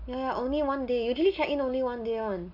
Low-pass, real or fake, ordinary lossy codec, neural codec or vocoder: 5.4 kHz; real; none; none